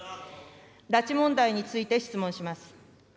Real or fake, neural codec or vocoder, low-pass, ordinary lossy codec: real; none; none; none